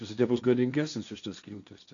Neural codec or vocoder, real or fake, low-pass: codec, 16 kHz, 1.1 kbps, Voila-Tokenizer; fake; 7.2 kHz